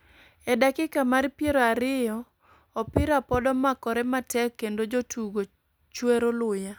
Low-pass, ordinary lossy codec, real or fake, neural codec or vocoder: none; none; real; none